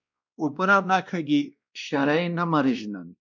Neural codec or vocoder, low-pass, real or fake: codec, 16 kHz, 1 kbps, X-Codec, WavLM features, trained on Multilingual LibriSpeech; 7.2 kHz; fake